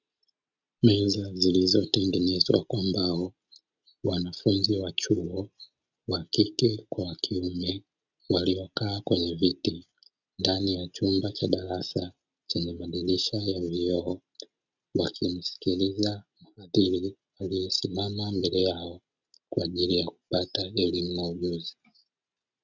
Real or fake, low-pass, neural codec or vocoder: real; 7.2 kHz; none